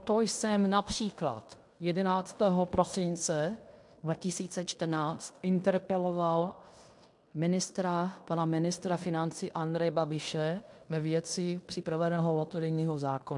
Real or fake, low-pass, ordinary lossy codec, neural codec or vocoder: fake; 10.8 kHz; MP3, 64 kbps; codec, 16 kHz in and 24 kHz out, 0.9 kbps, LongCat-Audio-Codec, fine tuned four codebook decoder